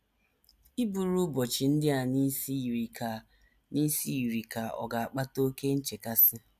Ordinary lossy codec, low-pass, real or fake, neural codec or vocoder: none; 14.4 kHz; real; none